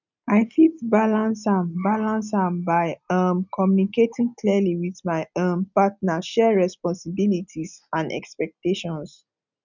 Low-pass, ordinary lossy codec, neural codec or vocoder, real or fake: 7.2 kHz; none; none; real